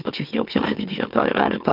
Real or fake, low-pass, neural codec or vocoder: fake; 5.4 kHz; autoencoder, 44.1 kHz, a latent of 192 numbers a frame, MeloTTS